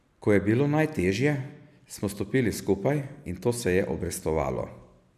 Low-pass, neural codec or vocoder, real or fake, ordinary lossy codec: 14.4 kHz; none; real; none